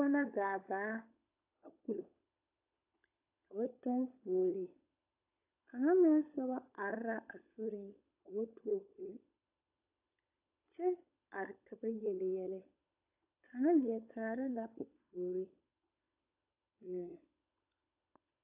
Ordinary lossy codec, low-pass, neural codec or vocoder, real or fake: AAC, 32 kbps; 3.6 kHz; codec, 16 kHz, 16 kbps, FunCodec, trained on LibriTTS, 50 frames a second; fake